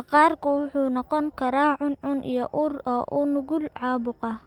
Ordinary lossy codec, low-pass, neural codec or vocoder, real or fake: Opus, 32 kbps; 19.8 kHz; none; real